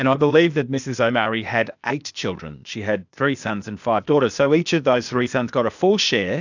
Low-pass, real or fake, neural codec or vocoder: 7.2 kHz; fake; codec, 16 kHz, 0.8 kbps, ZipCodec